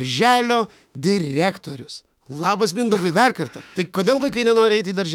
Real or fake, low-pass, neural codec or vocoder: fake; 19.8 kHz; autoencoder, 48 kHz, 32 numbers a frame, DAC-VAE, trained on Japanese speech